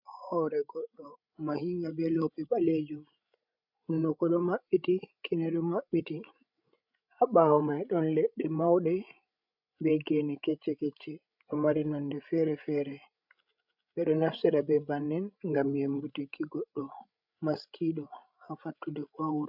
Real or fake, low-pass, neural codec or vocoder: fake; 5.4 kHz; codec, 16 kHz, 16 kbps, FreqCodec, larger model